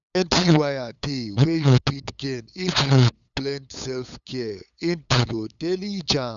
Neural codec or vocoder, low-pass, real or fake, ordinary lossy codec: codec, 16 kHz, 8 kbps, FunCodec, trained on LibriTTS, 25 frames a second; 7.2 kHz; fake; none